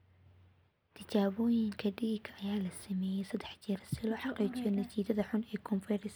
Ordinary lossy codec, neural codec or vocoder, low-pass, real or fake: none; none; none; real